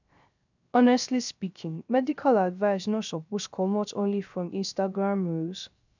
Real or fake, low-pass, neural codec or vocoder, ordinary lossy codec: fake; 7.2 kHz; codec, 16 kHz, 0.3 kbps, FocalCodec; none